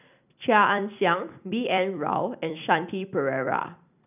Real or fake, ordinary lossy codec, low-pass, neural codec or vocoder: fake; none; 3.6 kHz; vocoder, 44.1 kHz, 128 mel bands every 256 samples, BigVGAN v2